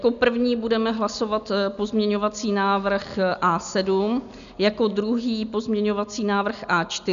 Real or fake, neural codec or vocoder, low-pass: real; none; 7.2 kHz